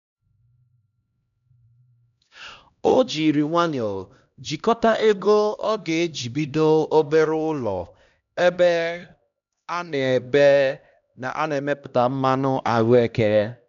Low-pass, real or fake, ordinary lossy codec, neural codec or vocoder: 7.2 kHz; fake; none; codec, 16 kHz, 1 kbps, X-Codec, HuBERT features, trained on LibriSpeech